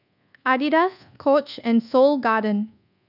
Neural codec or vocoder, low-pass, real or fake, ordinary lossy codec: codec, 24 kHz, 1.2 kbps, DualCodec; 5.4 kHz; fake; none